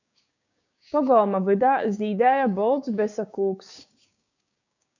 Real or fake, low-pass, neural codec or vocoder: fake; 7.2 kHz; codec, 16 kHz, 6 kbps, DAC